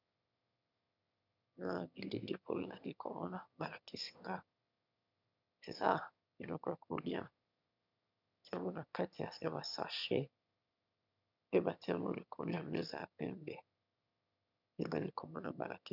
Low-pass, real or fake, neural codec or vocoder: 5.4 kHz; fake; autoencoder, 22.05 kHz, a latent of 192 numbers a frame, VITS, trained on one speaker